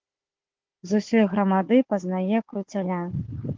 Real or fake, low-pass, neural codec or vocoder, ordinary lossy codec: fake; 7.2 kHz; codec, 16 kHz, 4 kbps, FunCodec, trained on Chinese and English, 50 frames a second; Opus, 16 kbps